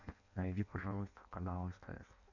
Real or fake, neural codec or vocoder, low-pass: fake; codec, 16 kHz in and 24 kHz out, 0.6 kbps, FireRedTTS-2 codec; 7.2 kHz